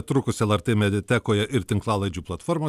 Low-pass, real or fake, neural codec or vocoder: 14.4 kHz; real; none